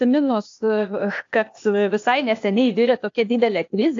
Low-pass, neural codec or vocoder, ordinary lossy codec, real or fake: 7.2 kHz; codec, 16 kHz, 0.8 kbps, ZipCodec; AAC, 48 kbps; fake